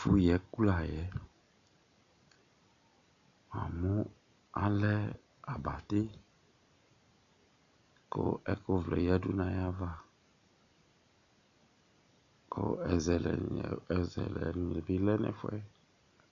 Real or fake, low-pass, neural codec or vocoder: real; 7.2 kHz; none